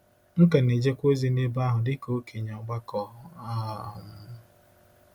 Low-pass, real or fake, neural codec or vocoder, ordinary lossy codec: 19.8 kHz; real; none; none